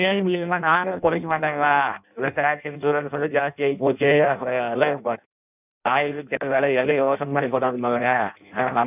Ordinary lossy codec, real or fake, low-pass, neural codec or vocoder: none; fake; 3.6 kHz; codec, 16 kHz in and 24 kHz out, 0.6 kbps, FireRedTTS-2 codec